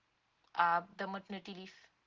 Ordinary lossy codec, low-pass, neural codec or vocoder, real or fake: Opus, 16 kbps; 7.2 kHz; none; real